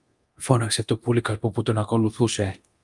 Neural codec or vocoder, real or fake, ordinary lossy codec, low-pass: codec, 24 kHz, 0.9 kbps, DualCodec; fake; Opus, 32 kbps; 10.8 kHz